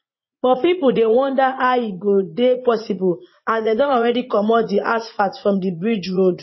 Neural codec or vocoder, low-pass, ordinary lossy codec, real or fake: vocoder, 44.1 kHz, 80 mel bands, Vocos; 7.2 kHz; MP3, 24 kbps; fake